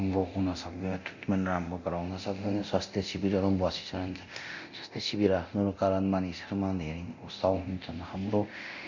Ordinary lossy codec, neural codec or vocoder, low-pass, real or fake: none; codec, 24 kHz, 0.9 kbps, DualCodec; 7.2 kHz; fake